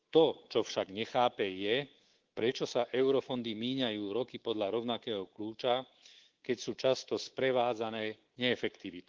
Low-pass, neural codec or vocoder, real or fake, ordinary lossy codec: 7.2 kHz; codec, 24 kHz, 3.1 kbps, DualCodec; fake; Opus, 16 kbps